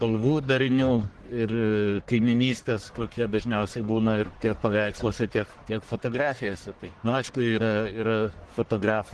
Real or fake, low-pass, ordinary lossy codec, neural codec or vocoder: fake; 10.8 kHz; Opus, 16 kbps; codec, 44.1 kHz, 1.7 kbps, Pupu-Codec